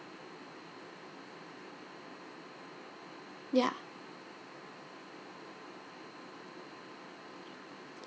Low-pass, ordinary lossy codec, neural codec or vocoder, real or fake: none; none; none; real